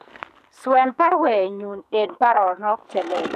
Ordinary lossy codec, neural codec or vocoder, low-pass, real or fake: none; codec, 44.1 kHz, 2.6 kbps, SNAC; 14.4 kHz; fake